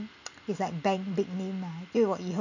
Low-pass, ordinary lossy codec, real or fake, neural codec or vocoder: 7.2 kHz; none; real; none